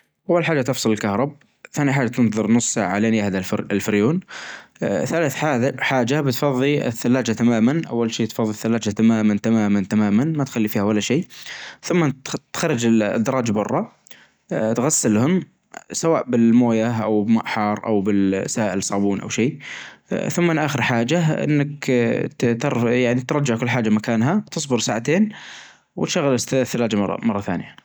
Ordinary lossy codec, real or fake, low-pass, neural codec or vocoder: none; real; none; none